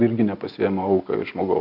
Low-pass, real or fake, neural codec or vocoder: 5.4 kHz; real; none